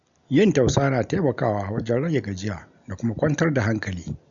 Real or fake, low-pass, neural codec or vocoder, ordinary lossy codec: real; 7.2 kHz; none; none